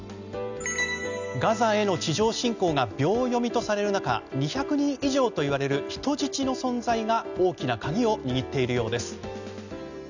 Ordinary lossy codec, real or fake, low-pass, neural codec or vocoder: none; real; 7.2 kHz; none